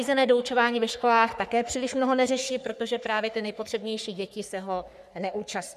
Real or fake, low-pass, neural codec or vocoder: fake; 14.4 kHz; codec, 44.1 kHz, 3.4 kbps, Pupu-Codec